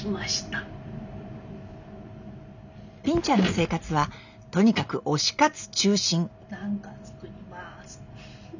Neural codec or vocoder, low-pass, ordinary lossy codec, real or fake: none; 7.2 kHz; none; real